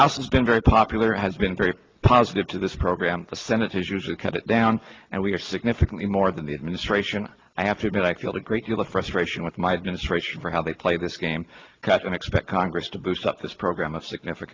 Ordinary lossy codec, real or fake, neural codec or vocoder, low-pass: Opus, 24 kbps; real; none; 7.2 kHz